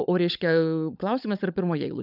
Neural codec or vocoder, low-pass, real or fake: codec, 16 kHz, 16 kbps, FunCodec, trained on LibriTTS, 50 frames a second; 5.4 kHz; fake